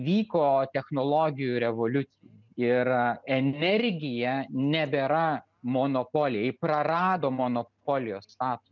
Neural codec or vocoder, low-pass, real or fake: none; 7.2 kHz; real